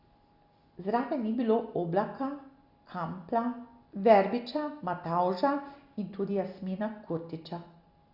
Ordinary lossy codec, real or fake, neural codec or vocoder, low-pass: Opus, 64 kbps; real; none; 5.4 kHz